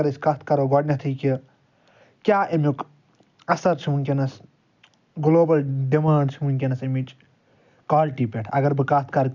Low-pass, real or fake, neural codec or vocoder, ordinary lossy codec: 7.2 kHz; real; none; none